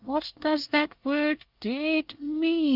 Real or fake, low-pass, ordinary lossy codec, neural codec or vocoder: fake; 5.4 kHz; Opus, 32 kbps; codec, 24 kHz, 1 kbps, SNAC